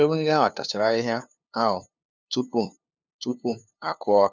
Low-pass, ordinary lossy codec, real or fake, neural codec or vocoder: none; none; fake; codec, 16 kHz, 2 kbps, FunCodec, trained on LibriTTS, 25 frames a second